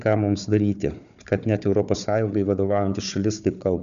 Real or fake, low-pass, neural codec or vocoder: fake; 7.2 kHz; codec, 16 kHz, 4 kbps, FunCodec, trained on Chinese and English, 50 frames a second